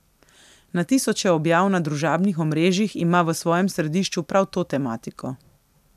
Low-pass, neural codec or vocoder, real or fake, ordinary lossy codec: 14.4 kHz; none; real; none